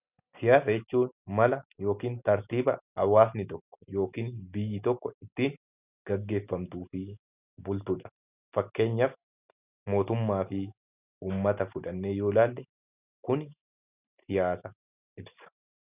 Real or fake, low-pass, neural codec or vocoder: real; 3.6 kHz; none